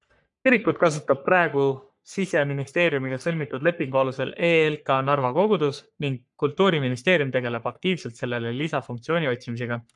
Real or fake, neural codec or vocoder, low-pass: fake; codec, 44.1 kHz, 3.4 kbps, Pupu-Codec; 10.8 kHz